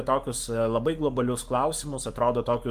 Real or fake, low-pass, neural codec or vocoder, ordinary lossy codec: fake; 14.4 kHz; codec, 44.1 kHz, 7.8 kbps, Pupu-Codec; Opus, 32 kbps